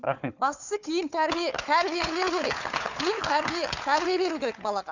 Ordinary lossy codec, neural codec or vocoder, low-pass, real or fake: none; codec, 16 kHz, 4 kbps, FunCodec, trained on Chinese and English, 50 frames a second; 7.2 kHz; fake